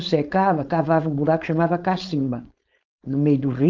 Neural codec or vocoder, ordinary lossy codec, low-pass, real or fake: codec, 16 kHz, 4.8 kbps, FACodec; Opus, 24 kbps; 7.2 kHz; fake